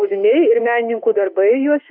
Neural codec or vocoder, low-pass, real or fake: autoencoder, 48 kHz, 32 numbers a frame, DAC-VAE, trained on Japanese speech; 5.4 kHz; fake